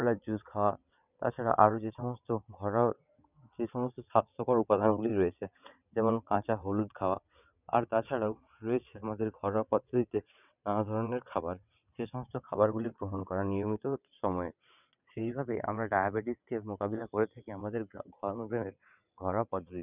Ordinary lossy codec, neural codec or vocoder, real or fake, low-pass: none; vocoder, 22.05 kHz, 80 mel bands, WaveNeXt; fake; 3.6 kHz